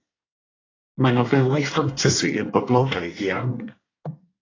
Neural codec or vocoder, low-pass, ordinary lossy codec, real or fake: codec, 24 kHz, 1 kbps, SNAC; 7.2 kHz; AAC, 32 kbps; fake